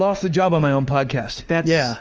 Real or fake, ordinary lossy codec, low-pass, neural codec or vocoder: fake; Opus, 24 kbps; 7.2 kHz; autoencoder, 48 kHz, 32 numbers a frame, DAC-VAE, trained on Japanese speech